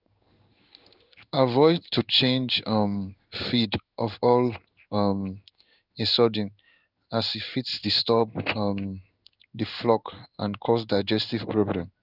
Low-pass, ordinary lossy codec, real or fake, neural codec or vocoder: 5.4 kHz; none; fake; codec, 16 kHz in and 24 kHz out, 1 kbps, XY-Tokenizer